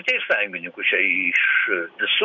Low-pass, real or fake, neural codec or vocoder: 7.2 kHz; real; none